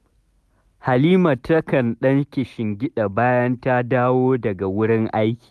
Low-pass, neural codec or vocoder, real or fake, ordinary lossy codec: none; none; real; none